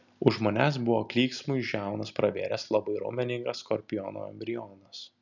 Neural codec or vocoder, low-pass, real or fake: none; 7.2 kHz; real